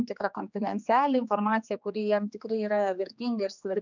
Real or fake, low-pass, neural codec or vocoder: fake; 7.2 kHz; codec, 16 kHz, 2 kbps, X-Codec, HuBERT features, trained on general audio